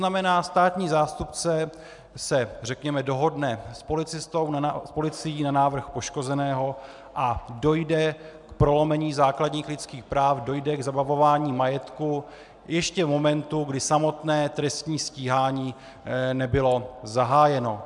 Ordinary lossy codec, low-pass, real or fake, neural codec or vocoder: MP3, 96 kbps; 10.8 kHz; real; none